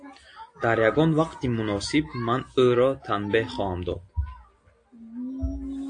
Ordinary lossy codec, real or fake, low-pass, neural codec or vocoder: AAC, 48 kbps; real; 9.9 kHz; none